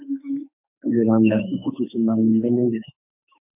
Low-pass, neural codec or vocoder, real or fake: 3.6 kHz; autoencoder, 48 kHz, 32 numbers a frame, DAC-VAE, trained on Japanese speech; fake